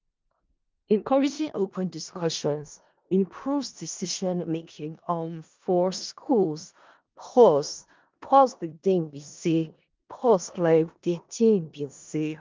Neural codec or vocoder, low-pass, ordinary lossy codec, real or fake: codec, 16 kHz in and 24 kHz out, 0.4 kbps, LongCat-Audio-Codec, four codebook decoder; 7.2 kHz; Opus, 32 kbps; fake